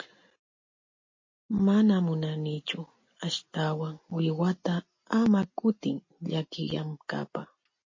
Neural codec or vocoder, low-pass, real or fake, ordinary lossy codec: none; 7.2 kHz; real; MP3, 32 kbps